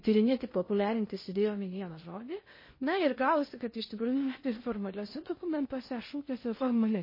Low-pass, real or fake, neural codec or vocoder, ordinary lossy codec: 5.4 kHz; fake; codec, 16 kHz in and 24 kHz out, 0.6 kbps, FocalCodec, streaming, 2048 codes; MP3, 24 kbps